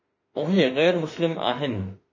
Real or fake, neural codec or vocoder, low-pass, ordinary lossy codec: fake; autoencoder, 48 kHz, 32 numbers a frame, DAC-VAE, trained on Japanese speech; 7.2 kHz; MP3, 32 kbps